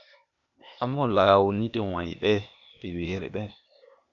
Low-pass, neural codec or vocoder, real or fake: 7.2 kHz; codec, 16 kHz, 0.8 kbps, ZipCodec; fake